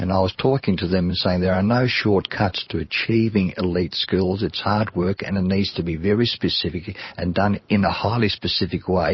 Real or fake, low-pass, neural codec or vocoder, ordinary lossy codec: fake; 7.2 kHz; vocoder, 44.1 kHz, 128 mel bands every 512 samples, BigVGAN v2; MP3, 24 kbps